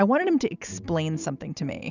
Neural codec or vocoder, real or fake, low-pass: none; real; 7.2 kHz